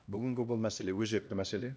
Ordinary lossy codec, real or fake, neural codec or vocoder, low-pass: none; fake; codec, 16 kHz, 1 kbps, X-Codec, HuBERT features, trained on LibriSpeech; none